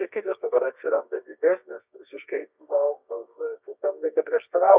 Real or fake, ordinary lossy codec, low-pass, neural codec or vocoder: fake; Opus, 64 kbps; 3.6 kHz; codec, 24 kHz, 0.9 kbps, WavTokenizer, medium music audio release